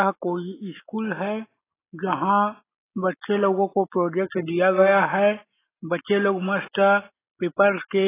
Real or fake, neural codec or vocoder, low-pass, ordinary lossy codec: real; none; 3.6 kHz; AAC, 16 kbps